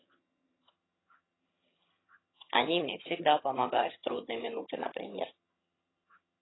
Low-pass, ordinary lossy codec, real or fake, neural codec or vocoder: 7.2 kHz; AAC, 16 kbps; fake; vocoder, 22.05 kHz, 80 mel bands, HiFi-GAN